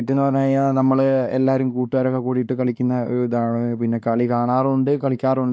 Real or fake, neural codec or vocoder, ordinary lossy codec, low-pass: fake; codec, 16 kHz, 2 kbps, X-Codec, WavLM features, trained on Multilingual LibriSpeech; none; none